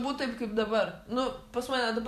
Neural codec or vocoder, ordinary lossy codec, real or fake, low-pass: vocoder, 44.1 kHz, 128 mel bands every 256 samples, BigVGAN v2; MP3, 96 kbps; fake; 14.4 kHz